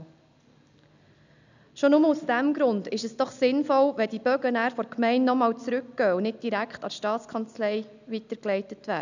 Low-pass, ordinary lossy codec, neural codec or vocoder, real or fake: 7.2 kHz; none; none; real